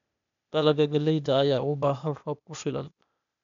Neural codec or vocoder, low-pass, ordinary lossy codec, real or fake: codec, 16 kHz, 0.8 kbps, ZipCodec; 7.2 kHz; none; fake